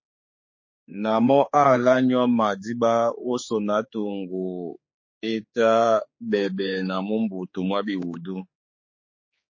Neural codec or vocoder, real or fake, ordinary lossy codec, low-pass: codec, 16 kHz, 4 kbps, X-Codec, HuBERT features, trained on general audio; fake; MP3, 32 kbps; 7.2 kHz